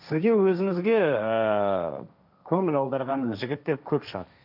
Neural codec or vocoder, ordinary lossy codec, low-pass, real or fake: codec, 16 kHz, 1.1 kbps, Voila-Tokenizer; none; 5.4 kHz; fake